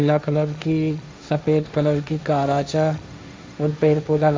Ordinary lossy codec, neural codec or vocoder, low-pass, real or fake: none; codec, 16 kHz, 1.1 kbps, Voila-Tokenizer; none; fake